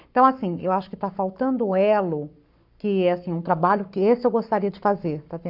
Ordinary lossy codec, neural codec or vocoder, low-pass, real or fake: none; codec, 44.1 kHz, 7.8 kbps, Pupu-Codec; 5.4 kHz; fake